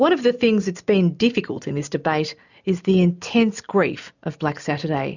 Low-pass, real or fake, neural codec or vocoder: 7.2 kHz; real; none